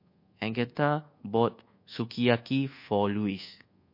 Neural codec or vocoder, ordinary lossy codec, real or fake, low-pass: codec, 24 kHz, 1.2 kbps, DualCodec; MP3, 32 kbps; fake; 5.4 kHz